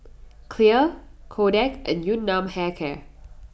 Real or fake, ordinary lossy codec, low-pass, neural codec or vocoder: real; none; none; none